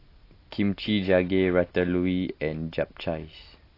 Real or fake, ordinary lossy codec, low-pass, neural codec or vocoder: real; AAC, 32 kbps; 5.4 kHz; none